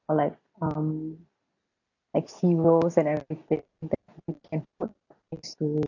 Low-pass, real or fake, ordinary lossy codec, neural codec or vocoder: 7.2 kHz; real; none; none